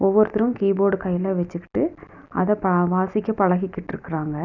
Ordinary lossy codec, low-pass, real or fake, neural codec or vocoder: none; 7.2 kHz; real; none